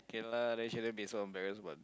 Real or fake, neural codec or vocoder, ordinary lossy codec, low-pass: real; none; none; none